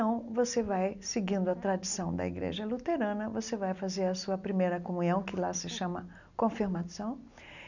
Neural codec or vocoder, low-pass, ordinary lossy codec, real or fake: none; 7.2 kHz; none; real